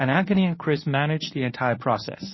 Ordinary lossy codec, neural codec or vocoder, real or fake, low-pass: MP3, 24 kbps; codec, 24 kHz, 0.9 kbps, WavTokenizer, medium speech release version 1; fake; 7.2 kHz